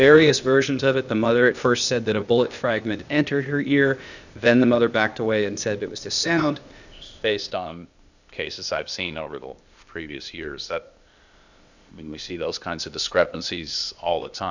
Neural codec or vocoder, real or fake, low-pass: codec, 16 kHz, 0.8 kbps, ZipCodec; fake; 7.2 kHz